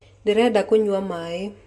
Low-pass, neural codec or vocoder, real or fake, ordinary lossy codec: none; none; real; none